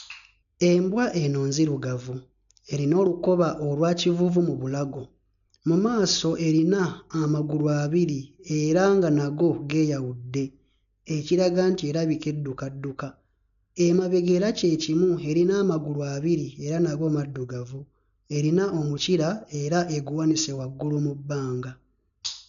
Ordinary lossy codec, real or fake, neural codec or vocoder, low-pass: none; real; none; 7.2 kHz